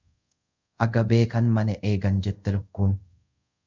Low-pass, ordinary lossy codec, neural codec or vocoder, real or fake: 7.2 kHz; MP3, 64 kbps; codec, 24 kHz, 0.5 kbps, DualCodec; fake